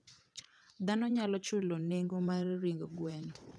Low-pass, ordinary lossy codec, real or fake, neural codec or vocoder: none; none; fake; vocoder, 22.05 kHz, 80 mel bands, WaveNeXt